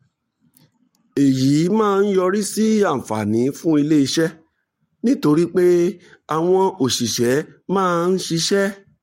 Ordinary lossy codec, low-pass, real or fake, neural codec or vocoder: MP3, 64 kbps; 19.8 kHz; fake; codec, 44.1 kHz, 7.8 kbps, Pupu-Codec